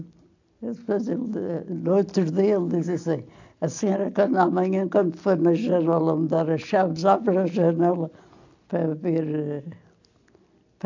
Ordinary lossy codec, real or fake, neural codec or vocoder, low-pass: AAC, 48 kbps; real; none; 7.2 kHz